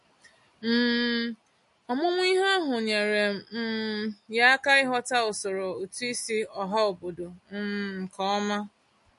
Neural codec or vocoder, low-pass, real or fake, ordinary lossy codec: none; 14.4 kHz; real; MP3, 48 kbps